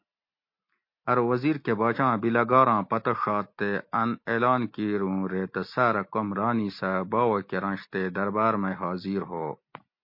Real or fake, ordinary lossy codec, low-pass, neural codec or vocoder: real; MP3, 32 kbps; 5.4 kHz; none